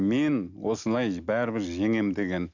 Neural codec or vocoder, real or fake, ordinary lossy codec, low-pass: none; real; none; 7.2 kHz